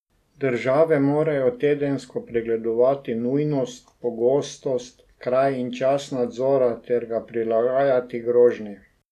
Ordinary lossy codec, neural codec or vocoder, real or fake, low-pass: none; none; real; 14.4 kHz